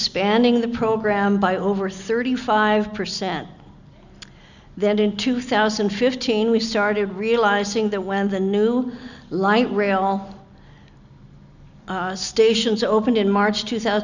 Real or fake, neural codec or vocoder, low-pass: real; none; 7.2 kHz